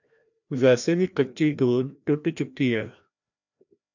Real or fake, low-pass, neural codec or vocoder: fake; 7.2 kHz; codec, 16 kHz, 1 kbps, FreqCodec, larger model